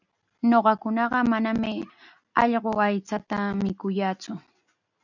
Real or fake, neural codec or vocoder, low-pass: real; none; 7.2 kHz